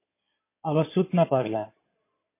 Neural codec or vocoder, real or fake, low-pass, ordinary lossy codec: codec, 16 kHz in and 24 kHz out, 2.2 kbps, FireRedTTS-2 codec; fake; 3.6 kHz; MP3, 24 kbps